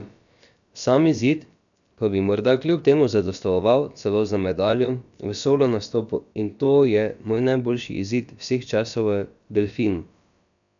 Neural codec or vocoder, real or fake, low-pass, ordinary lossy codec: codec, 16 kHz, about 1 kbps, DyCAST, with the encoder's durations; fake; 7.2 kHz; none